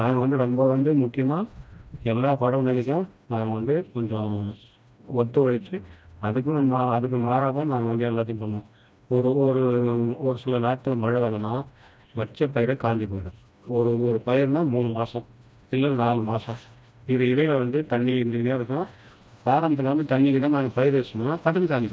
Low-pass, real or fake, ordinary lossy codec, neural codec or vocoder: none; fake; none; codec, 16 kHz, 1 kbps, FreqCodec, smaller model